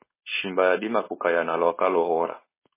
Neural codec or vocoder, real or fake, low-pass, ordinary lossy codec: codec, 16 kHz, 4.8 kbps, FACodec; fake; 3.6 kHz; MP3, 16 kbps